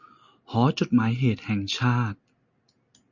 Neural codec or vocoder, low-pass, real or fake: none; 7.2 kHz; real